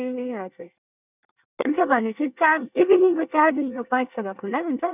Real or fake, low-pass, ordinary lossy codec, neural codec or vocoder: fake; 3.6 kHz; none; codec, 24 kHz, 1 kbps, SNAC